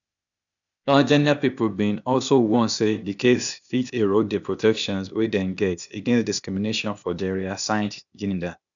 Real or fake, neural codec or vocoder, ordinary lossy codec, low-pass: fake; codec, 16 kHz, 0.8 kbps, ZipCodec; none; 7.2 kHz